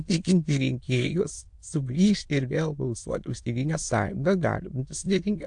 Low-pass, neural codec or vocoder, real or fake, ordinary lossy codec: 9.9 kHz; autoencoder, 22.05 kHz, a latent of 192 numbers a frame, VITS, trained on many speakers; fake; AAC, 64 kbps